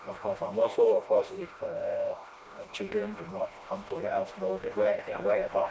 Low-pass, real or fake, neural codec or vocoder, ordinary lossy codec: none; fake; codec, 16 kHz, 1 kbps, FreqCodec, smaller model; none